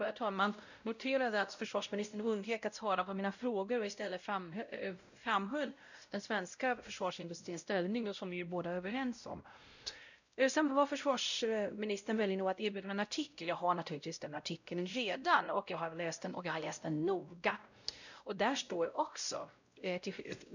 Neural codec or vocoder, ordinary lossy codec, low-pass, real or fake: codec, 16 kHz, 0.5 kbps, X-Codec, WavLM features, trained on Multilingual LibriSpeech; none; 7.2 kHz; fake